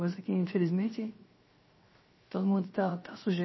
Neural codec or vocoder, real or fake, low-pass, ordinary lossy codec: codec, 16 kHz, 0.7 kbps, FocalCodec; fake; 7.2 kHz; MP3, 24 kbps